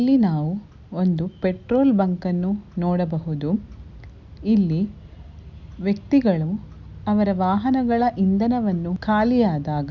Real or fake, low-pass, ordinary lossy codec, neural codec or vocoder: real; 7.2 kHz; none; none